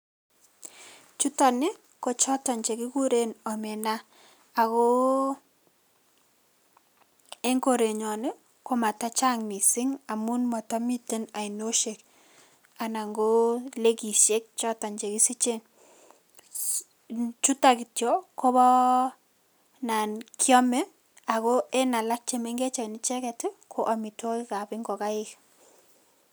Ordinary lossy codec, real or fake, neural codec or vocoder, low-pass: none; real; none; none